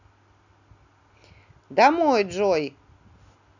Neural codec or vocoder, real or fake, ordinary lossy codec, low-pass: none; real; none; 7.2 kHz